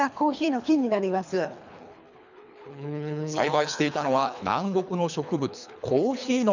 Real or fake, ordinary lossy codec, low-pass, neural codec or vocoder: fake; none; 7.2 kHz; codec, 24 kHz, 3 kbps, HILCodec